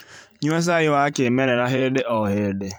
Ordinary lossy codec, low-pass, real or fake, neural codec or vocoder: none; none; fake; vocoder, 44.1 kHz, 128 mel bands every 512 samples, BigVGAN v2